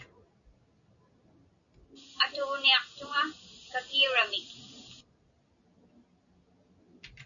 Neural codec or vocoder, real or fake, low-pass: none; real; 7.2 kHz